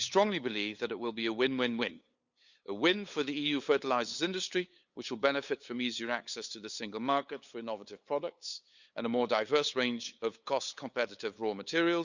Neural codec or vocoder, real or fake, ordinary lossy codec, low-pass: codec, 16 kHz, 8 kbps, FunCodec, trained on LibriTTS, 25 frames a second; fake; Opus, 64 kbps; 7.2 kHz